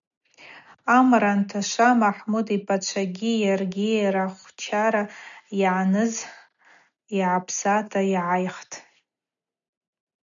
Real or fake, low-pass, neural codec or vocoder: real; 7.2 kHz; none